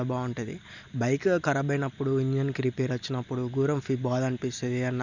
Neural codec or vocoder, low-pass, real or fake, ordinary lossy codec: none; 7.2 kHz; real; none